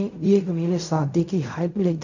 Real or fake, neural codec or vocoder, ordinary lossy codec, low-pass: fake; codec, 16 kHz in and 24 kHz out, 0.4 kbps, LongCat-Audio-Codec, fine tuned four codebook decoder; none; 7.2 kHz